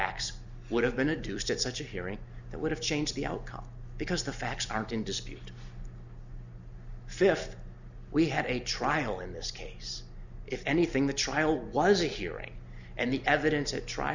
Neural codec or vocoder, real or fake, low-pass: none; real; 7.2 kHz